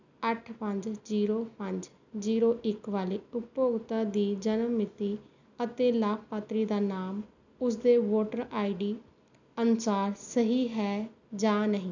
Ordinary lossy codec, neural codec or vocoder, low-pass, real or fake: none; none; 7.2 kHz; real